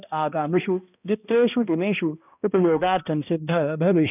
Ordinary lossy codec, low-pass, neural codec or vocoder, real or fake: none; 3.6 kHz; codec, 16 kHz, 1 kbps, X-Codec, HuBERT features, trained on balanced general audio; fake